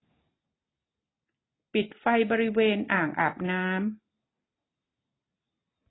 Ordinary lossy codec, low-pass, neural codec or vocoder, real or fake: AAC, 16 kbps; 7.2 kHz; none; real